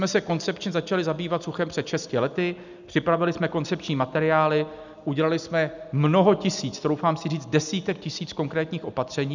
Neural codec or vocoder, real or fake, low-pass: none; real; 7.2 kHz